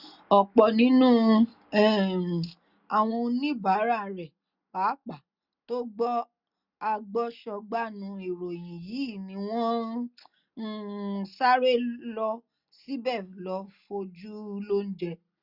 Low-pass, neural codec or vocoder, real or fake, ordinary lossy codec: 5.4 kHz; none; real; none